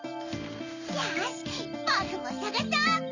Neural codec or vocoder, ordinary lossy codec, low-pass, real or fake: none; none; 7.2 kHz; real